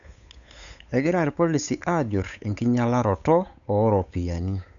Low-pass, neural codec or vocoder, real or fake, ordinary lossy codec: 7.2 kHz; codec, 16 kHz, 8 kbps, FunCodec, trained on Chinese and English, 25 frames a second; fake; none